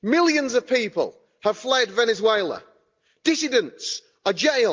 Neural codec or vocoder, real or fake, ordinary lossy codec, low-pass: none; real; Opus, 24 kbps; 7.2 kHz